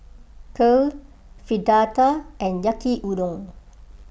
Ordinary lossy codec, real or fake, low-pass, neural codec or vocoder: none; real; none; none